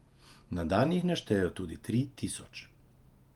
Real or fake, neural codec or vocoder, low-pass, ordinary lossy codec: fake; vocoder, 48 kHz, 128 mel bands, Vocos; 19.8 kHz; Opus, 32 kbps